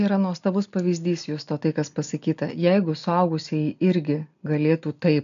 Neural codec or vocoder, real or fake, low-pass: none; real; 7.2 kHz